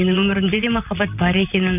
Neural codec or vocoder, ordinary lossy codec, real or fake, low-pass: vocoder, 44.1 kHz, 128 mel bands, Pupu-Vocoder; none; fake; 3.6 kHz